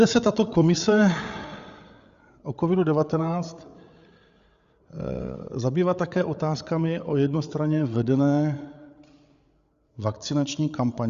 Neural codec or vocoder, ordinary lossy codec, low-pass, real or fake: codec, 16 kHz, 8 kbps, FreqCodec, larger model; Opus, 64 kbps; 7.2 kHz; fake